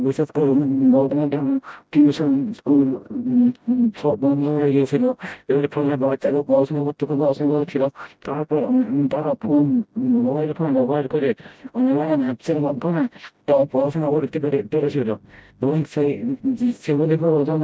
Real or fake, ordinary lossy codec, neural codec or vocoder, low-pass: fake; none; codec, 16 kHz, 0.5 kbps, FreqCodec, smaller model; none